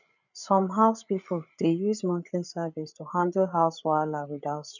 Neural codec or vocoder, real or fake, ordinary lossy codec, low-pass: codec, 16 kHz, 8 kbps, FreqCodec, larger model; fake; none; 7.2 kHz